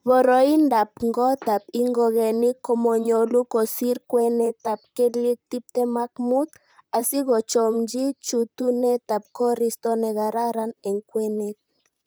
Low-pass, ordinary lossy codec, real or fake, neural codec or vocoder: none; none; fake; vocoder, 44.1 kHz, 128 mel bands, Pupu-Vocoder